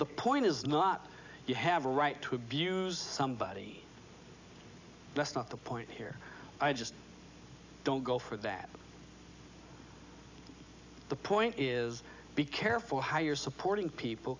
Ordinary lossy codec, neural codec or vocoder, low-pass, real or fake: AAC, 48 kbps; none; 7.2 kHz; real